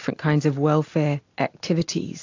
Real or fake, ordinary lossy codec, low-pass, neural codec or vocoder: real; AAC, 48 kbps; 7.2 kHz; none